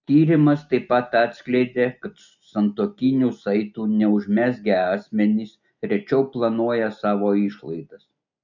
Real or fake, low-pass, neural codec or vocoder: real; 7.2 kHz; none